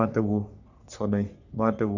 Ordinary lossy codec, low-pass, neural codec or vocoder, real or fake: none; 7.2 kHz; codec, 44.1 kHz, 3.4 kbps, Pupu-Codec; fake